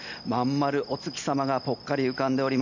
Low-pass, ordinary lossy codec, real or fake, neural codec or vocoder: 7.2 kHz; none; real; none